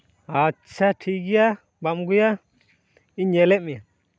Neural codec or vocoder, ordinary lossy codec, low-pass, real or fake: none; none; none; real